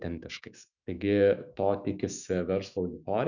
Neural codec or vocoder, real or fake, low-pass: autoencoder, 48 kHz, 128 numbers a frame, DAC-VAE, trained on Japanese speech; fake; 7.2 kHz